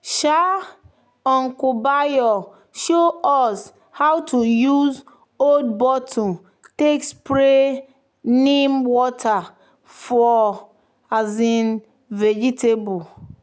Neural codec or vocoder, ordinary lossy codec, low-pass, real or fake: none; none; none; real